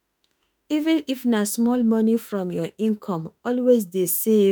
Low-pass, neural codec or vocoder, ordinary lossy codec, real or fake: none; autoencoder, 48 kHz, 32 numbers a frame, DAC-VAE, trained on Japanese speech; none; fake